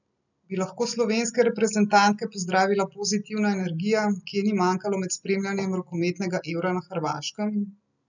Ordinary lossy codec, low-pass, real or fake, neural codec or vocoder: none; 7.2 kHz; real; none